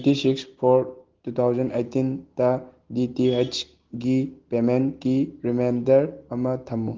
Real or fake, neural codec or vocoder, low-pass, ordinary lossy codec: real; none; 7.2 kHz; Opus, 16 kbps